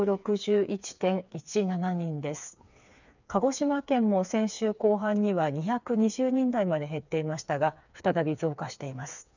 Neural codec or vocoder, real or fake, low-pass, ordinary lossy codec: codec, 16 kHz, 4 kbps, FreqCodec, smaller model; fake; 7.2 kHz; none